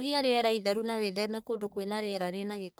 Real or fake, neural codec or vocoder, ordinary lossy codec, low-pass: fake; codec, 44.1 kHz, 1.7 kbps, Pupu-Codec; none; none